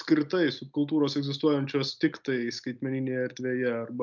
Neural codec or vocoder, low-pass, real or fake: none; 7.2 kHz; real